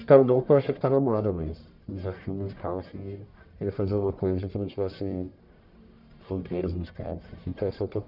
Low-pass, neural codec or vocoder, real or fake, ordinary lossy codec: 5.4 kHz; codec, 44.1 kHz, 1.7 kbps, Pupu-Codec; fake; none